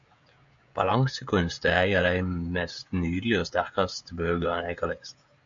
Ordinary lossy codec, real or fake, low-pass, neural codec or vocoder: MP3, 64 kbps; fake; 7.2 kHz; codec, 16 kHz, 16 kbps, FreqCodec, smaller model